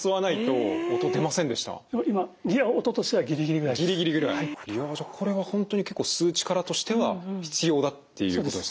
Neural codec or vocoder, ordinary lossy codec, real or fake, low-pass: none; none; real; none